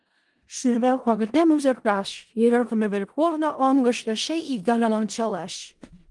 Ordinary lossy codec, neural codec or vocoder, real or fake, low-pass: Opus, 24 kbps; codec, 16 kHz in and 24 kHz out, 0.4 kbps, LongCat-Audio-Codec, four codebook decoder; fake; 10.8 kHz